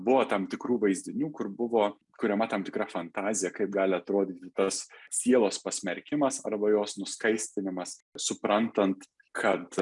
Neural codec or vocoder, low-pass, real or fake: none; 10.8 kHz; real